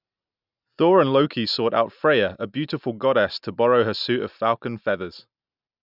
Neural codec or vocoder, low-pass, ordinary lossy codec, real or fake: none; 5.4 kHz; none; real